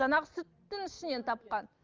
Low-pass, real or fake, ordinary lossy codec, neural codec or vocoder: 7.2 kHz; real; Opus, 32 kbps; none